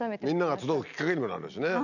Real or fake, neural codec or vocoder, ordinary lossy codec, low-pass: real; none; none; 7.2 kHz